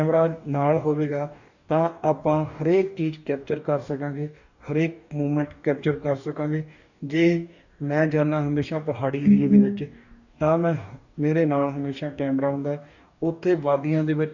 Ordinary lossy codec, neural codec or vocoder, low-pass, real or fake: none; codec, 44.1 kHz, 2.6 kbps, DAC; 7.2 kHz; fake